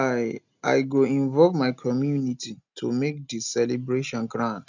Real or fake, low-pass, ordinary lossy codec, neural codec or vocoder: real; 7.2 kHz; none; none